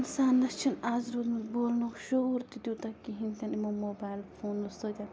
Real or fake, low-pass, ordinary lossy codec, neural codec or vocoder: real; none; none; none